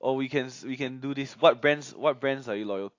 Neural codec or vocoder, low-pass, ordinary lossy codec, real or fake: none; 7.2 kHz; AAC, 48 kbps; real